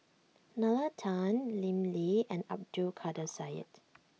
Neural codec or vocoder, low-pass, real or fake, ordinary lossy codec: none; none; real; none